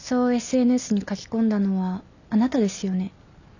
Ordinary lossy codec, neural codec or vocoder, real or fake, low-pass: none; none; real; 7.2 kHz